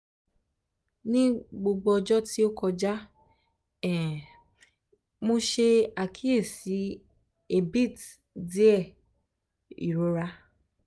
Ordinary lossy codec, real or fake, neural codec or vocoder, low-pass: none; real; none; none